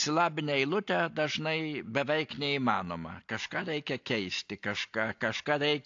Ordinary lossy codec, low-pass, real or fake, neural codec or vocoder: AAC, 48 kbps; 7.2 kHz; real; none